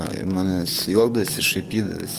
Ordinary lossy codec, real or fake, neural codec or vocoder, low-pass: Opus, 32 kbps; fake; codec, 44.1 kHz, 7.8 kbps, DAC; 14.4 kHz